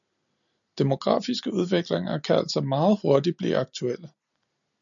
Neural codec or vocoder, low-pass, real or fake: none; 7.2 kHz; real